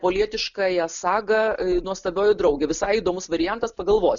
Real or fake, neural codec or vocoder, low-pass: real; none; 7.2 kHz